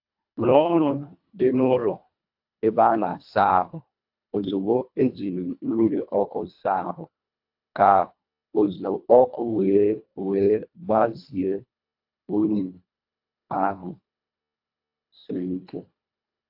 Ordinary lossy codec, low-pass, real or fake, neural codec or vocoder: AAC, 48 kbps; 5.4 kHz; fake; codec, 24 kHz, 1.5 kbps, HILCodec